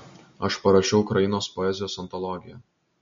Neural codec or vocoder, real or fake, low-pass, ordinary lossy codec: none; real; 7.2 kHz; MP3, 48 kbps